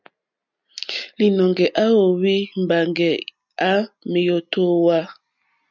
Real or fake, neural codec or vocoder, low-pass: real; none; 7.2 kHz